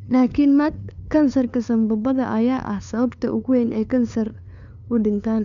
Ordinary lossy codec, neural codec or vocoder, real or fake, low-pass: none; codec, 16 kHz, 2 kbps, FunCodec, trained on LibriTTS, 25 frames a second; fake; 7.2 kHz